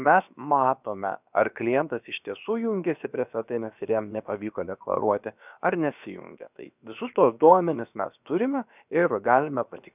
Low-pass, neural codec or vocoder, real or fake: 3.6 kHz; codec, 16 kHz, about 1 kbps, DyCAST, with the encoder's durations; fake